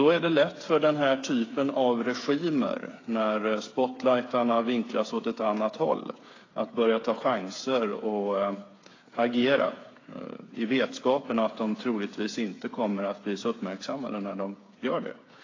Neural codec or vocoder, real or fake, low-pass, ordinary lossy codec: codec, 16 kHz, 8 kbps, FreqCodec, smaller model; fake; 7.2 kHz; AAC, 32 kbps